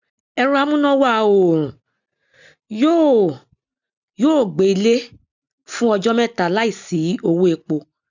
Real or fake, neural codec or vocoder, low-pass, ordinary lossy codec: real; none; 7.2 kHz; none